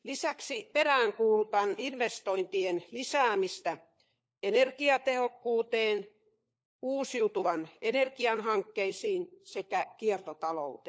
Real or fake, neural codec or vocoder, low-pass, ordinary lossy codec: fake; codec, 16 kHz, 4 kbps, FunCodec, trained on LibriTTS, 50 frames a second; none; none